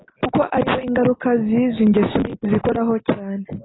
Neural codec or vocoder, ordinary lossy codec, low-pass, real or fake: none; AAC, 16 kbps; 7.2 kHz; real